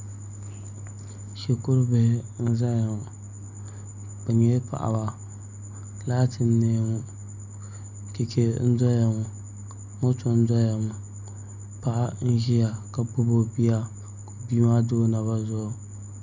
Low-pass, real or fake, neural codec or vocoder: 7.2 kHz; real; none